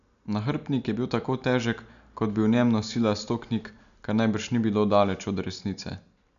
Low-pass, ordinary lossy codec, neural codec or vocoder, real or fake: 7.2 kHz; none; none; real